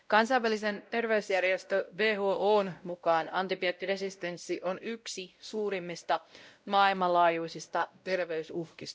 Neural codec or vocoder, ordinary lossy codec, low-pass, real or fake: codec, 16 kHz, 0.5 kbps, X-Codec, WavLM features, trained on Multilingual LibriSpeech; none; none; fake